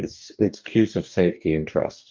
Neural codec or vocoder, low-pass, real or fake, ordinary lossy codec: codec, 44.1 kHz, 2.6 kbps, DAC; 7.2 kHz; fake; Opus, 24 kbps